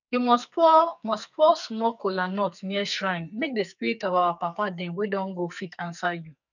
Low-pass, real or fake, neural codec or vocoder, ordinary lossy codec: 7.2 kHz; fake; codec, 44.1 kHz, 2.6 kbps, SNAC; none